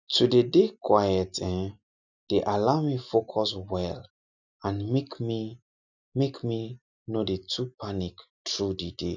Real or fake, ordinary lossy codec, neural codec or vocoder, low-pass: real; none; none; 7.2 kHz